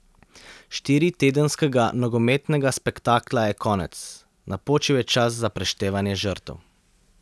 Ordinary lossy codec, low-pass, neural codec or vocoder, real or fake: none; none; none; real